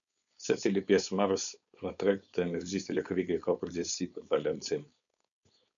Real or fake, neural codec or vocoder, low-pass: fake; codec, 16 kHz, 4.8 kbps, FACodec; 7.2 kHz